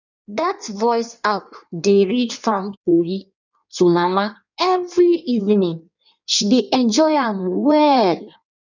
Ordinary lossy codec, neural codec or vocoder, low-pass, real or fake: none; codec, 16 kHz in and 24 kHz out, 1.1 kbps, FireRedTTS-2 codec; 7.2 kHz; fake